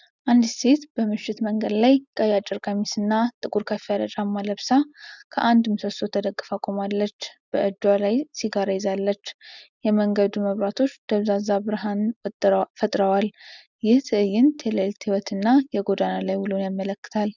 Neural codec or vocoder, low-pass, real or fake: none; 7.2 kHz; real